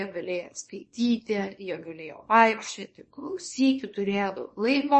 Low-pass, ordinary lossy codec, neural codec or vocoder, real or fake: 10.8 kHz; MP3, 32 kbps; codec, 24 kHz, 0.9 kbps, WavTokenizer, small release; fake